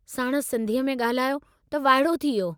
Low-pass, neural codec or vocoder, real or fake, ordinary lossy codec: none; none; real; none